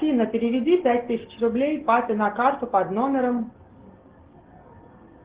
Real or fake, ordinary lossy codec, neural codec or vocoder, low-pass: real; Opus, 16 kbps; none; 3.6 kHz